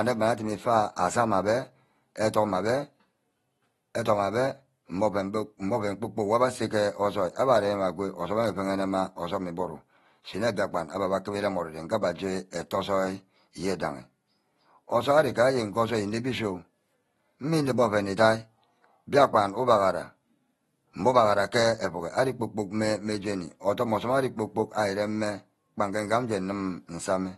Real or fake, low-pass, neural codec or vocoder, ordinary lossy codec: real; 19.8 kHz; none; AAC, 32 kbps